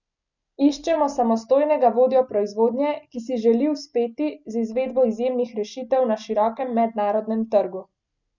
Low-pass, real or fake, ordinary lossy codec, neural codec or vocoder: 7.2 kHz; real; none; none